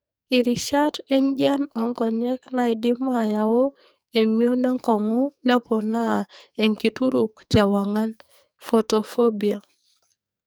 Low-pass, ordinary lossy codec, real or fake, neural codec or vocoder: none; none; fake; codec, 44.1 kHz, 2.6 kbps, SNAC